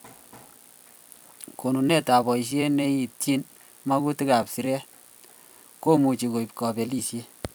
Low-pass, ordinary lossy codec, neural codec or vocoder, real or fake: none; none; vocoder, 44.1 kHz, 128 mel bands every 256 samples, BigVGAN v2; fake